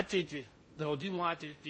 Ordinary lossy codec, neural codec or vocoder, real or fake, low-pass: MP3, 32 kbps; codec, 16 kHz in and 24 kHz out, 0.6 kbps, FocalCodec, streaming, 4096 codes; fake; 9.9 kHz